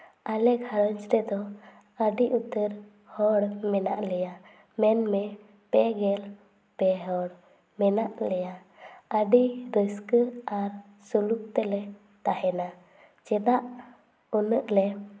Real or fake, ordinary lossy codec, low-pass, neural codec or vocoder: real; none; none; none